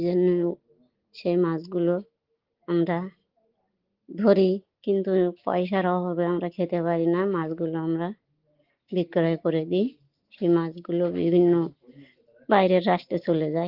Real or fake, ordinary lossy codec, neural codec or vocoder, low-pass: real; Opus, 16 kbps; none; 5.4 kHz